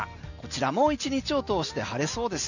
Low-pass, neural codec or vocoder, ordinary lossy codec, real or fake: 7.2 kHz; none; none; real